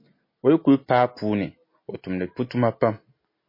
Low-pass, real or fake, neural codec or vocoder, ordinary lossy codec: 5.4 kHz; real; none; MP3, 24 kbps